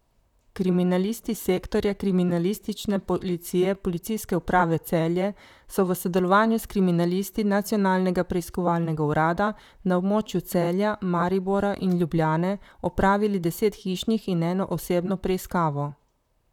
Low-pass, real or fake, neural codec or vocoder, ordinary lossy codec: 19.8 kHz; fake; vocoder, 44.1 kHz, 128 mel bands, Pupu-Vocoder; none